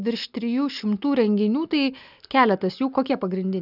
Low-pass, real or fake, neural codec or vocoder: 5.4 kHz; real; none